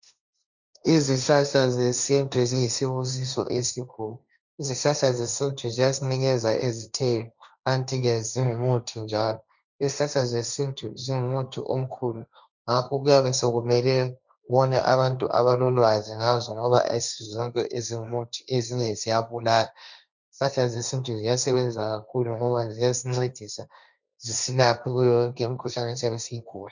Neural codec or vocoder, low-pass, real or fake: codec, 16 kHz, 1.1 kbps, Voila-Tokenizer; 7.2 kHz; fake